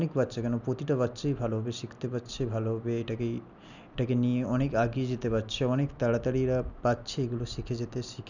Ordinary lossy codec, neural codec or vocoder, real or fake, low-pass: none; none; real; 7.2 kHz